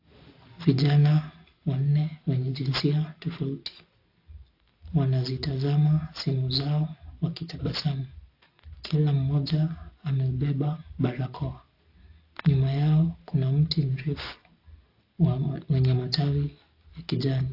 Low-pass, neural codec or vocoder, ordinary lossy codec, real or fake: 5.4 kHz; none; AAC, 32 kbps; real